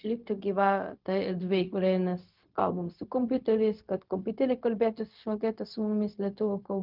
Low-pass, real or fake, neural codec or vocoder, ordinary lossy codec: 5.4 kHz; fake; codec, 16 kHz, 0.4 kbps, LongCat-Audio-Codec; Opus, 24 kbps